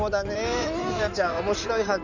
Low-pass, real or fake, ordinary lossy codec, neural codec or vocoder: 7.2 kHz; fake; none; codec, 16 kHz in and 24 kHz out, 2.2 kbps, FireRedTTS-2 codec